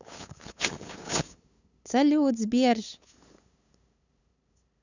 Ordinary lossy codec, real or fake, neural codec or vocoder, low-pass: none; fake; codec, 16 kHz, 8 kbps, FunCodec, trained on LibriTTS, 25 frames a second; 7.2 kHz